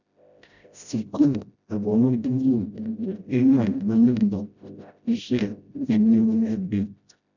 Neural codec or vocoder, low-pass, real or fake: codec, 16 kHz, 0.5 kbps, FreqCodec, smaller model; 7.2 kHz; fake